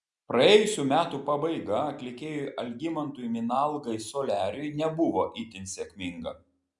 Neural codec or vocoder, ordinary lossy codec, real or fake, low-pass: none; Opus, 64 kbps; real; 10.8 kHz